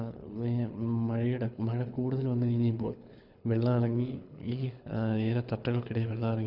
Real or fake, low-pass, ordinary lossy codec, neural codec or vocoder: fake; 5.4 kHz; none; codec, 24 kHz, 6 kbps, HILCodec